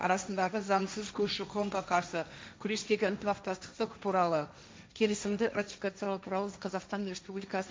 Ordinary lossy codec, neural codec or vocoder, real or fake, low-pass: none; codec, 16 kHz, 1.1 kbps, Voila-Tokenizer; fake; none